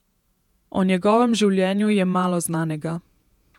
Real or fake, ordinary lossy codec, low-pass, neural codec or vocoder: fake; none; 19.8 kHz; vocoder, 48 kHz, 128 mel bands, Vocos